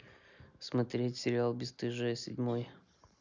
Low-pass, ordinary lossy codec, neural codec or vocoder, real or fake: 7.2 kHz; none; none; real